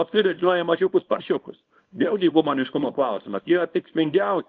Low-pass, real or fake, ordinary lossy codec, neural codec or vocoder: 7.2 kHz; fake; Opus, 32 kbps; codec, 24 kHz, 0.9 kbps, WavTokenizer, small release